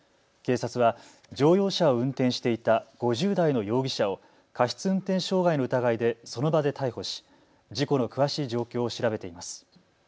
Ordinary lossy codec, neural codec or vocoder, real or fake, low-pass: none; none; real; none